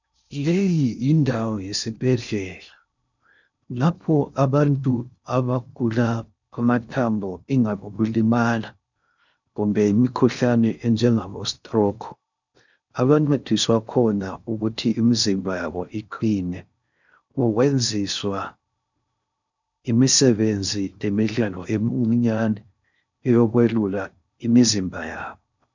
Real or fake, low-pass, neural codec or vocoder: fake; 7.2 kHz; codec, 16 kHz in and 24 kHz out, 0.6 kbps, FocalCodec, streaming, 2048 codes